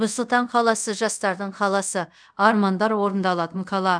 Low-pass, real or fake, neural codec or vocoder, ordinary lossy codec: 9.9 kHz; fake; codec, 24 kHz, 0.5 kbps, DualCodec; none